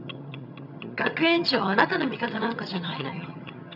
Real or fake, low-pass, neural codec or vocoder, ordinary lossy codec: fake; 5.4 kHz; vocoder, 22.05 kHz, 80 mel bands, HiFi-GAN; none